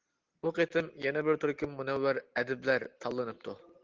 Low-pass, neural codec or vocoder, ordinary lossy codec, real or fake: 7.2 kHz; none; Opus, 16 kbps; real